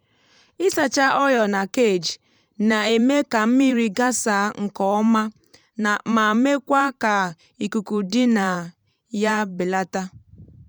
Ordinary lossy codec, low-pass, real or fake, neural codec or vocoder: none; 19.8 kHz; fake; vocoder, 44.1 kHz, 128 mel bands every 512 samples, BigVGAN v2